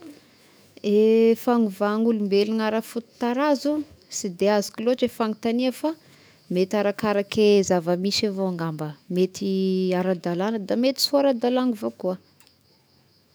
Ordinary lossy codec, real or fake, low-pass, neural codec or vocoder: none; fake; none; autoencoder, 48 kHz, 128 numbers a frame, DAC-VAE, trained on Japanese speech